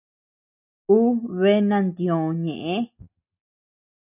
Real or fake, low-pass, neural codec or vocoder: real; 3.6 kHz; none